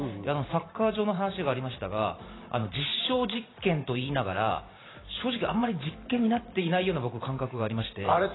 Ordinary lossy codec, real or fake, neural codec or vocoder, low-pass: AAC, 16 kbps; fake; vocoder, 44.1 kHz, 128 mel bands every 512 samples, BigVGAN v2; 7.2 kHz